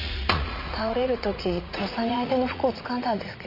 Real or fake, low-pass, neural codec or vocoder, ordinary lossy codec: fake; 5.4 kHz; vocoder, 44.1 kHz, 80 mel bands, Vocos; none